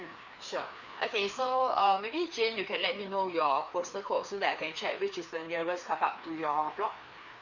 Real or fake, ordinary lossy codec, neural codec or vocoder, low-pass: fake; none; codec, 16 kHz, 2 kbps, FreqCodec, larger model; 7.2 kHz